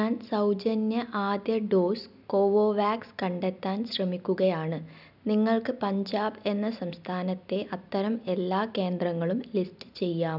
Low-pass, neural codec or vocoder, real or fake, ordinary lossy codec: 5.4 kHz; none; real; none